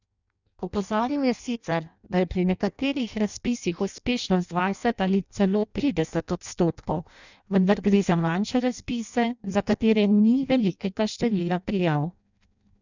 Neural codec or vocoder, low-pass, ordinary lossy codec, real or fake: codec, 16 kHz in and 24 kHz out, 0.6 kbps, FireRedTTS-2 codec; 7.2 kHz; none; fake